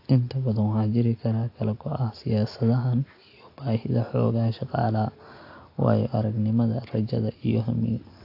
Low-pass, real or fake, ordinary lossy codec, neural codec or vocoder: 5.4 kHz; real; AAC, 32 kbps; none